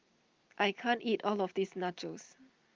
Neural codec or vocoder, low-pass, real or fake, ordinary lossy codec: none; 7.2 kHz; real; Opus, 32 kbps